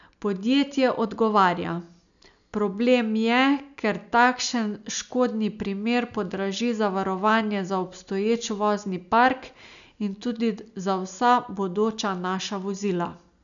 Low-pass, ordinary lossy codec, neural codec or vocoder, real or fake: 7.2 kHz; none; none; real